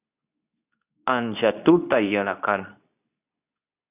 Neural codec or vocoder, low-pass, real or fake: codec, 24 kHz, 0.9 kbps, WavTokenizer, medium speech release version 2; 3.6 kHz; fake